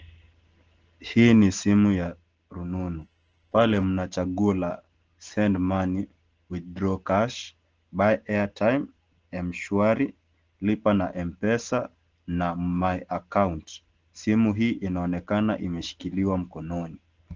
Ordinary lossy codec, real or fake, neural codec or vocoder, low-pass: Opus, 16 kbps; real; none; 7.2 kHz